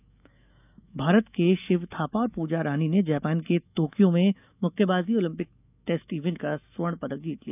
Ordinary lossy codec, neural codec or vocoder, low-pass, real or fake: none; autoencoder, 48 kHz, 128 numbers a frame, DAC-VAE, trained on Japanese speech; 3.6 kHz; fake